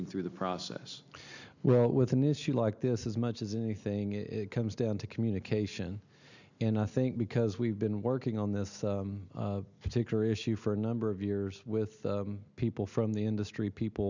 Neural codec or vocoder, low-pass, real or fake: none; 7.2 kHz; real